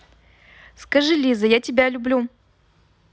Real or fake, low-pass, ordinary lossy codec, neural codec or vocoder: real; none; none; none